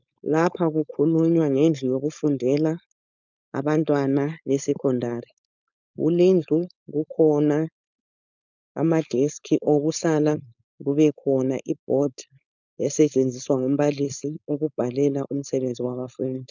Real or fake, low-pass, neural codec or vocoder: fake; 7.2 kHz; codec, 16 kHz, 4.8 kbps, FACodec